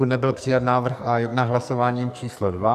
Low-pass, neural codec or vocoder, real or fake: 14.4 kHz; codec, 44.1 kHz, 2.6 kbps, SNAC; fake